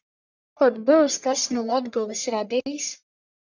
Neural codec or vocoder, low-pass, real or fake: codec, 44.1 kHz, 1.7 kbps, Pupu-Codec; 7.2 kHz; fake